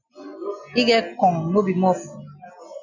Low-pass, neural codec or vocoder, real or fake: 7.2 kHz; none; real